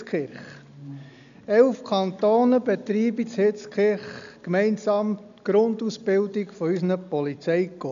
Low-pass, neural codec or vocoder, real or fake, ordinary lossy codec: 7.2 kHz; none; real; none